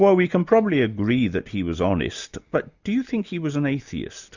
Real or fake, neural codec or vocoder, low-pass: real; none; 7.2 kHz